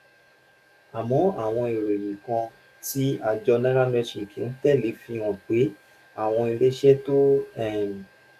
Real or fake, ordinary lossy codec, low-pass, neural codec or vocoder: fake; none; 14.4 kHz; codec, 44.1 kHz, 7.8 kbps, DAC